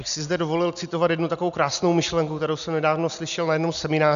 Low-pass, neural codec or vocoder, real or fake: 7.2 kHz; none; real